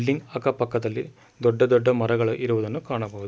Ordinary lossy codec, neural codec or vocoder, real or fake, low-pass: none; none; real; none